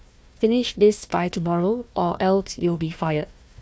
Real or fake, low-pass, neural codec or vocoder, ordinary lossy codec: fake; none; codec, 16 kHz, 1 kbps, FunCodec, trained on Chinese and English, 50 frames a second; none